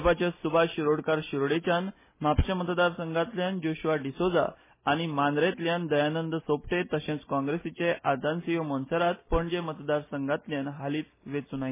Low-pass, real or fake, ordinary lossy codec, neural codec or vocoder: 3.6 kHz; real; MP3, 16 kbps; none